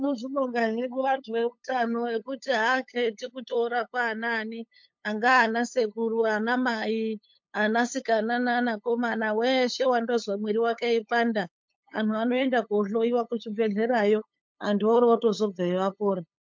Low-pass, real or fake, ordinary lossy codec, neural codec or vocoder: 7.2 kHz; fake; MP3, 48 kbps; codec, 16 kHz, 8 kbps, FunCodec, trained on LibriTTS, 25 frames a second